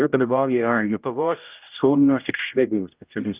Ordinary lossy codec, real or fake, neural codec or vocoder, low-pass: Opus, 24 kbps; fake; codec, 16 kHz, 0.5 kbps, X-Codec, HuBERT features, trained on general audio; 3.6 kHz